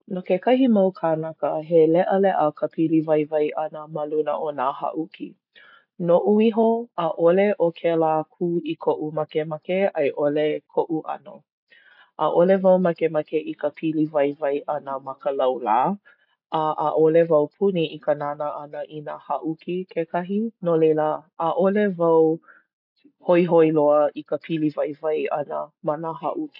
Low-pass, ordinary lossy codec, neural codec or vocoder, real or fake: 5.4 kHz; AAC, 48 kbps; none; real